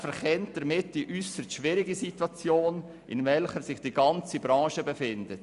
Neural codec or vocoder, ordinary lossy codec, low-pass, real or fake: none; none; 10.8 kHz; real